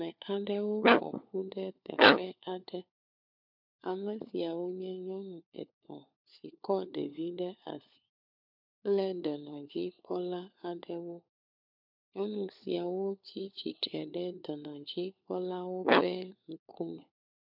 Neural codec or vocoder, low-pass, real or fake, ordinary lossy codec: codec, 16 kHz, 4 kbps, FunCodec, trained on LibriTTS, 50 frames a second; 5.4 kHz; fake; MP3, 48 kbps